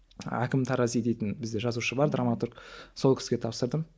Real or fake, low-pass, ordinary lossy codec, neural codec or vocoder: real; none; none; none